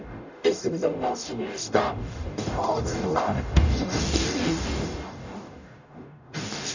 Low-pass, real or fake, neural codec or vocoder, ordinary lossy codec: 7.2 kHz; fake; codec, 44.1 kHz, 0.9 kbps, DAC; none